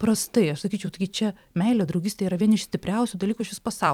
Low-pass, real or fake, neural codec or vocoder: 19.8 kHz; fake; vocoder, 44.1 kHz, 128 mel bands every 256 samples, BigVGAN v2